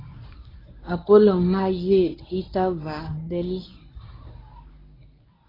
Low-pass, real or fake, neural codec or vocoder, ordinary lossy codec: 5.4 kHz; fake; codec, 24 kHz, 0.9 kbps, WavTokenizer, medium speech release version 1; AAC, 24 kbps